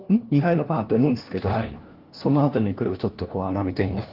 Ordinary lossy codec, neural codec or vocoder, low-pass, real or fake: Opus, 16 kbps; codec, 16 kHz, 1 kbps, FunCodec, trained on LibriTTS, 50 frames a second; 5.4 kHz; fake